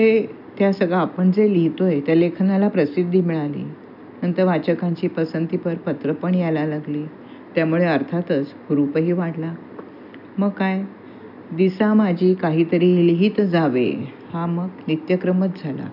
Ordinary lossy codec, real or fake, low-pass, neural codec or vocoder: none; real; 5.4 kHz; none